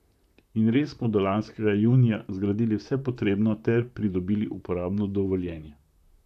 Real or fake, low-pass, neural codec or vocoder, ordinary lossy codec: fake; 14.4 kHz; vocoder, 44.1 kHz, 128 mel bands, Pupu-Vocoder; none